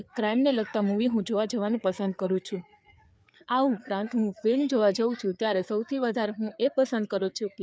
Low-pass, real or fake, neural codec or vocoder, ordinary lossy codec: none; fake; codec, 16 kHz, 4 kbps, FreqCodec, larger model; none